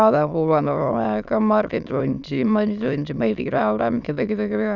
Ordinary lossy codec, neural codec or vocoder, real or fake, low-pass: none; autoencoder, 22.05 kHz, a latent of 192 numbers a frame, VITS, trained on many speakers; fake; 7.2 kHz